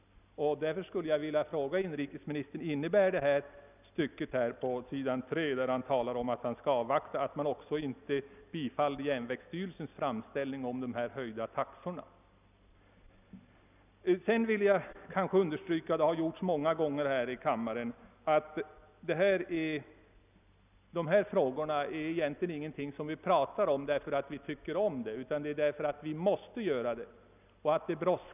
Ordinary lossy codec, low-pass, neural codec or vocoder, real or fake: none; 3.6 kHz; none; real